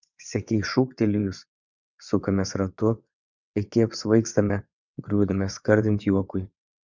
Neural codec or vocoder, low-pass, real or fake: vocoder, 22.05 kHz, 80 mel bands, WaveNeXt; 7.2 kHz; fake